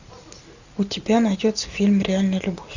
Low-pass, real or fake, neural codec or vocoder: 7.2 kHz; real; none